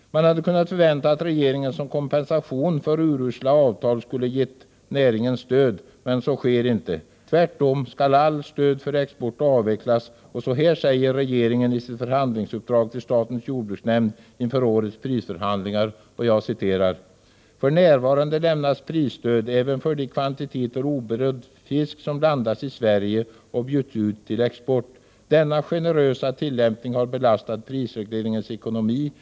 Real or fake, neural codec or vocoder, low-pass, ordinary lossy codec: real; none; none; none